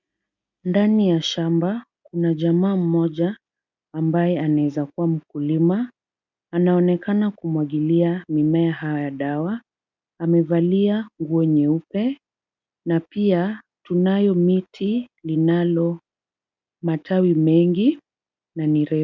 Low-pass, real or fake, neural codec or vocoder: 7.2 kHz; real; none